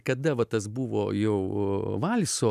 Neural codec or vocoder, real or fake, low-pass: none; real; 14.4 kHz